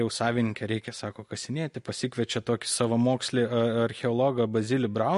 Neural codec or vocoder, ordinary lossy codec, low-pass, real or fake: none; MP3, 48 kbps; 14.4 kHz; real